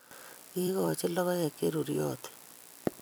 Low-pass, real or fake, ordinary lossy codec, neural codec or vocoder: none; fake; none; vocoder, 44.1 kHz, 128 mel bands every 256 samples, BigVGAN v2